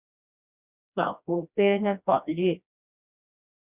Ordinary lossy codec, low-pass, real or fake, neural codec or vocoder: Opus, 64 kbps; 3.6 kHz; fake; codec, 16 kHz, 1 kbps, FreqCodec, smaller model